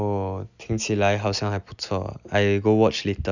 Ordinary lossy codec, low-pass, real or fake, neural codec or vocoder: none; 7.2 kHz; real; none